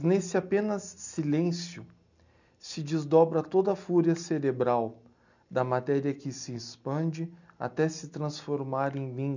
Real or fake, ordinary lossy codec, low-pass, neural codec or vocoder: real; none; 7.2 kHz; none